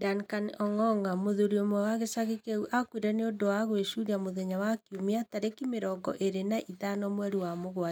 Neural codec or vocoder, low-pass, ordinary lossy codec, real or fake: none; 19.8 kHz; none; real